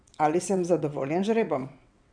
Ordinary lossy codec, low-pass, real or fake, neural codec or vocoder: none; 9.9 kHz; fake; vocoder, 22.05 kHz, 80 mel bands, WaveNeXt